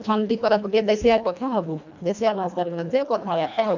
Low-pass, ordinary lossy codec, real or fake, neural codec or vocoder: 7.2 kHz; none; fake; codec, 24 kHz, 1.5 kbps, HILCodec